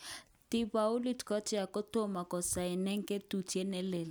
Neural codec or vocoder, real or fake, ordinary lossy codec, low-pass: none; real; none; none